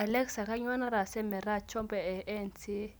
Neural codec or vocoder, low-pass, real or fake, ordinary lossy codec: none; none; real; none